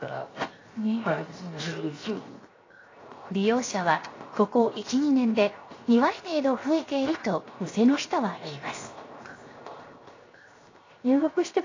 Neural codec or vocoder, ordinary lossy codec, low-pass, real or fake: codec, 16 kHz, 0.7 kbps, FocalCodec; AAC, 32 kbps; 7.2 kHz; fake